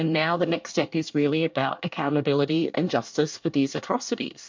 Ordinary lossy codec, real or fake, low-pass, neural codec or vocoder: MP3, 64 kbps; fake; 7.2 kHz; codec, 24 kHz, 1 kbps, SNAC